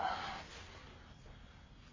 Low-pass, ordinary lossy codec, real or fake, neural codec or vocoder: 7.2 kHz; AAC, 32 kbps; real; none